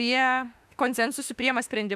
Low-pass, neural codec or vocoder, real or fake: 14.4 kHz; autoencoder, 48 kHz, 32 numbers a frame, DAC-VAE, trained on Japanese speech; fake